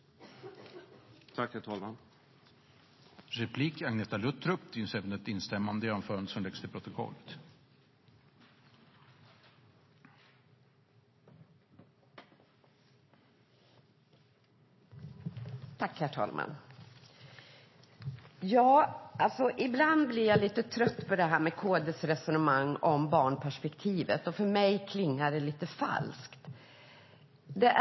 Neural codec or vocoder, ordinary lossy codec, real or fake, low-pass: none; MP3, 24 kbps; real; 7.2 kHz